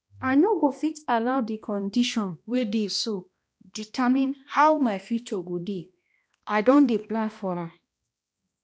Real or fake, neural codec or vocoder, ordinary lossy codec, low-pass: fake; codec, 16 kHz, 1 kbps, X-Codec, HuBERT features, trained on balanced general audio; none; none